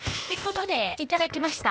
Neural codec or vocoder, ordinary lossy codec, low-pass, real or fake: codec, 16 kHz, 0.8 kbps, ZipCodec; none; none; fake